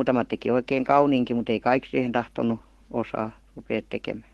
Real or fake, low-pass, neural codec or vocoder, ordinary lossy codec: fake; 19.8 kHz; codec, 44.1 kHz, 7.8 kbps, Pupu-Codec; Opus, 16 kbps